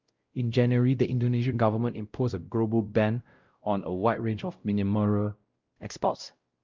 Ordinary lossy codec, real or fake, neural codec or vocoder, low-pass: Opus, 32 kbps; fake; codec, 16 kHz, 0.5 kbps, X-Codec, WavLM features, trained on Multilingual LibriSpeech; 7.2 kHz